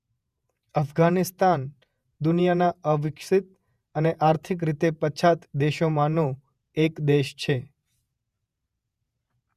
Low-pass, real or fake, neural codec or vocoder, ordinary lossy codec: 14.4 kHz; fake; vocoder, 48 kHz, 128 mel bands, Vocos; Opus, 64 kbps